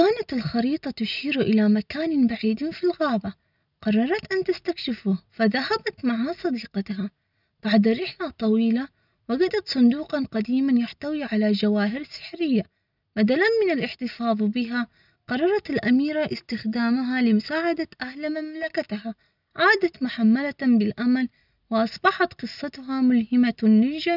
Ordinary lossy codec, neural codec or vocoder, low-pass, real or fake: none; none; 5.4 kHz; real